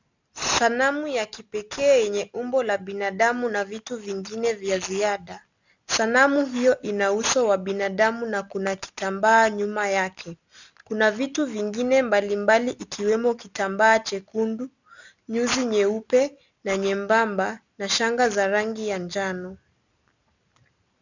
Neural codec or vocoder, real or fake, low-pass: none; real; 7.2 kHz